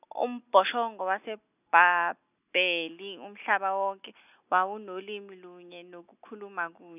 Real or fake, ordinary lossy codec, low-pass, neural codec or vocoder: real; none; 3.6 kHz; none